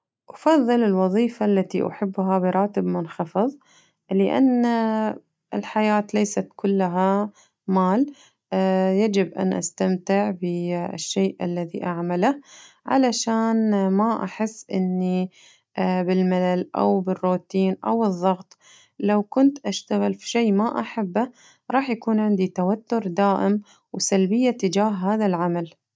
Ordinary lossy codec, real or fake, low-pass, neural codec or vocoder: none; real; none; none